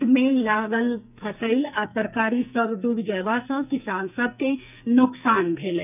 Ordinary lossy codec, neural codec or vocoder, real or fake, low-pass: none; codec, 44.1 kHz, 2.6 kbps, SNAC; fake; 3.6 kHz